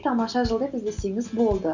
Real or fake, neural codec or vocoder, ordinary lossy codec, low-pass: real; none; none; 7.2 kHz